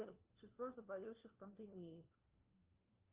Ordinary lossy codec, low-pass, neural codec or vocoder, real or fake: Opus, 24 kbps; 3.6 kHz; codec, 16 kHz, 1 kbps, FunCodec, trained on LibriTTS, 50 frames a second; fake